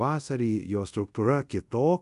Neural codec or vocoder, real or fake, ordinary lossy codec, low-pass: codec, 24 kHz, 0.5 kbps, DualCodec; fake; MP3, 96 kbps; 10.8 kHz